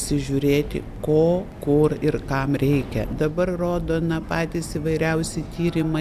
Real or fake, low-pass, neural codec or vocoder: real; 14.4 kHz; none